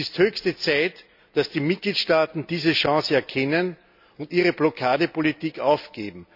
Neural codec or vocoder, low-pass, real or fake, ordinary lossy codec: none; 5.4 kHz; real; none